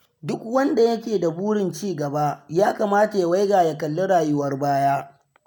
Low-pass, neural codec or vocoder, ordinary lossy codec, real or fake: none; none; none; real